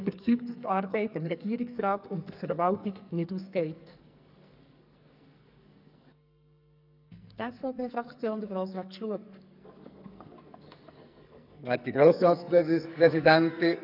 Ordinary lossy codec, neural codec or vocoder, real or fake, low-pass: none; codec, 44.1 kHz, 2.6 kbps, SNAC; fake; 5.4 kHz